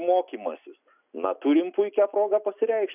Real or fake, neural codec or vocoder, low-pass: real; none; 3.6 kHz